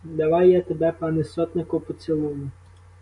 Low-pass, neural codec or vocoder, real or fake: 10.8 kHz; none; real